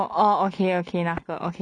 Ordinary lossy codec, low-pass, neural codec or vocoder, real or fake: none; 9.9 kHz; none; real